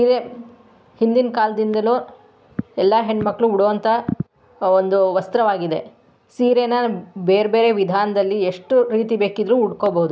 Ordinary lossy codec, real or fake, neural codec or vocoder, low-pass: none; real; none; none